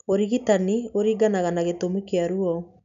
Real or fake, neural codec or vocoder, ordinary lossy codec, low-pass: real; none; none; 7.2 kHz